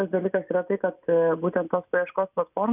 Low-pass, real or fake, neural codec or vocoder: 3.6 kHz; real; none